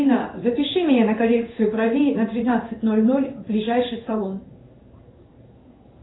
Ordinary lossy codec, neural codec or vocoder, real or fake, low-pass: AAC, 16 kbps; codec, 16 kHz in and 24 kHz out, 1 kbps, XY-Tokenizer; fake; 7.2 kHz